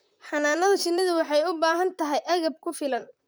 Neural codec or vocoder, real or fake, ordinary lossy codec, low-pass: vocoder, 44.1 kHz, 128 mel bands, Pupu-Vocoder; fake; none; none